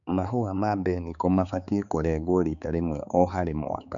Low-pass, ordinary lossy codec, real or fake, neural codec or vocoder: 7.2 kHz; none; fake; codec, 16 kHz, 4 kbps, X-Codec, HuBERT features, trained on balanced general audio